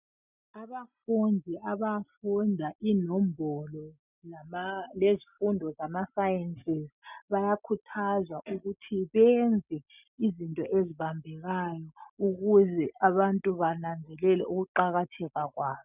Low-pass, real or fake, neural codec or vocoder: 3.6 kHz; real; none